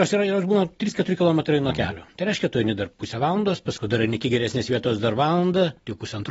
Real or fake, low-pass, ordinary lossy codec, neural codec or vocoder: real; 14.4 kHz; AAC, 24 kbps; none